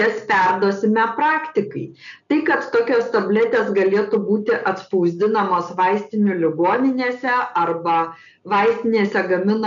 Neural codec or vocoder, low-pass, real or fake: none; 7.2 kHz; real